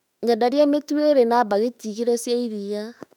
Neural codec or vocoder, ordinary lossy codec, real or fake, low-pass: autoencoder, 48 kHz, 32 numbers a frame, DAC-VAE, trained on Japanese speech; none; fake; 19.8 kHz